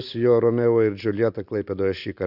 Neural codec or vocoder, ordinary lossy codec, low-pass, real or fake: vocoder, 44.1 kHz, 128 mel bands every 256 samples, BigVGAN v2; Opus, 64 kbps; 5.4 kHz; fake